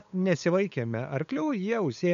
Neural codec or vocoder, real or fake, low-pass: codec, 16 kHz, 8 kbps, FunCodec, trained on LibriTTS, 25 frames a second; fake; 7.2 kHz